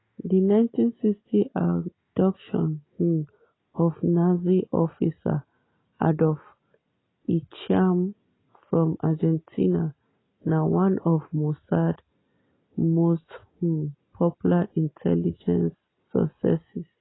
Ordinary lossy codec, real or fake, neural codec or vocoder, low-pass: AAC, 16 kbps; real; none; 7.2 kHz